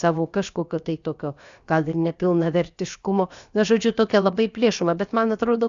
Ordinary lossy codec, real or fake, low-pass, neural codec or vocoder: Opus, 64 kbps; fake; 7.2 kHz; codec, 16 kHz, about 1 kbps, DyCAST, with the encoder's durations